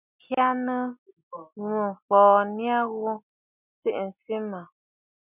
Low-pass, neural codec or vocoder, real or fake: 3.6 kHz; none; real